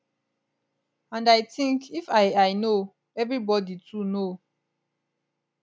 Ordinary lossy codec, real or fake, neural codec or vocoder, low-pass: none; real; none; none